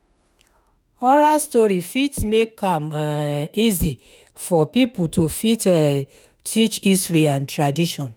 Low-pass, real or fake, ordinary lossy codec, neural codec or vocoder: none; fake; none; autoencoder, 48 kHz, 32 numbers a frame, DAC-VAE, trained on Japanese speech